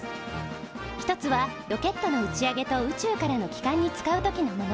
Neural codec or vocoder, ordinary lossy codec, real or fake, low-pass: none; none; real; none